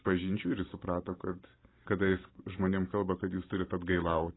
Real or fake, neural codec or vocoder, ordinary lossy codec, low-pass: fake; vocoder, 44.1 kHz, 128 mel bands every 512 samples, BigVGAN v2; AAC, 16 kbps; 7.2 kHz